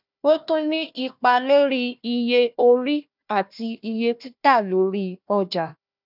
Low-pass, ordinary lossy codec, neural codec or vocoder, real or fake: 5.4 kHz; none; codec, 16 kHz, 1 kbps, FunCodec, trained on Chinese and English, 50 frames a second; fake